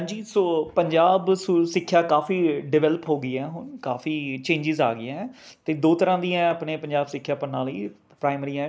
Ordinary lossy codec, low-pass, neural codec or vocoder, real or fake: none; none; none; real